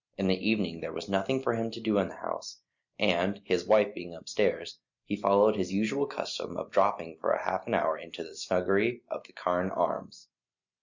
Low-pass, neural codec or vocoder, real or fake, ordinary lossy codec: 7.2 kHz; none; real; Opus, 64 kbps